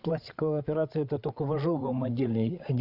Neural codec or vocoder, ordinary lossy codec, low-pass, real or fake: codec, 16 kHz, 8 kbps, FreqCodec, larger model; Opus, 64 kbps; 5.4 kHz; fake